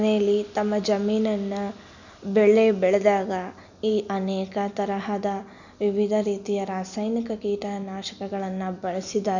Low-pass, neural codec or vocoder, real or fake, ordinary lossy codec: 7.2 kHz; none; real; none